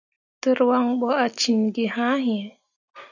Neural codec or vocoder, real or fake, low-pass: none; real; 7.2 kHz